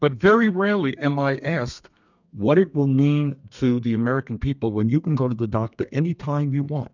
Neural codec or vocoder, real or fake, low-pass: codec, 32 kHz, 1.9 kbps, SNAC; fake; 7.2 kHz